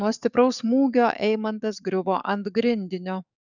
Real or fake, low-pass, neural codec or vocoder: fake; 7.2 kHz; codec, 44.1 kHz, 7.8 kbps, DAC